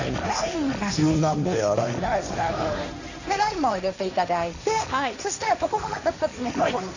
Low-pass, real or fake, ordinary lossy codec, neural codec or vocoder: 7.2 kHz; fake; none; codec, 16 kHz, 1.1 kbps, Voila-Tokenizer